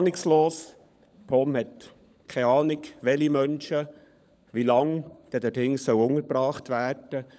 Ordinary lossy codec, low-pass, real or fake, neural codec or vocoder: none; none; fake; codec, 16 kHz, 16 kbps, FunCodec, trained on LibriTTS, 50 frames a second